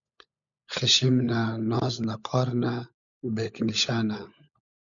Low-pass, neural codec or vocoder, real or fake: 7.2 kHz; codec, 16 kHz, 16 kbps, FunCodec, trained on LibriTTS, 50 frames a second; fake